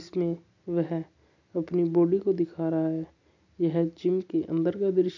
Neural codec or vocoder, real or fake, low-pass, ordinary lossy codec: none; real; 7.2 kHz; none